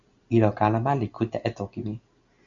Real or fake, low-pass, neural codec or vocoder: real; 7.2 kHz; none